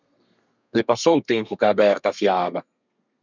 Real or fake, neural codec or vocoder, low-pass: fake; codec, 32 kHz, 1.9 kbps, SNAC; 7.2 kHz